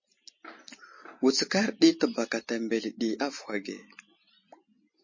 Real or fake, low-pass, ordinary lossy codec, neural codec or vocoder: real; 7.2 kHz; MP3, 32 kbps; none